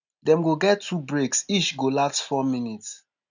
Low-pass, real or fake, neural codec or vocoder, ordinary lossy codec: 7.2 kHz; real; none; none